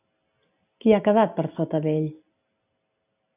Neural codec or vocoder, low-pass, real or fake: none; 3.6 kHz; real